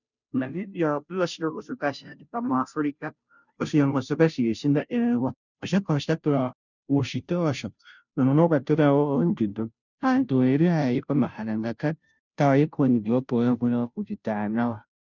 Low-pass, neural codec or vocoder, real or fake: 7.2 kHz; codec, 16 kHz, 0.5 kbps, FunCodec, trained on Chinese and English, 25 frames a second; fake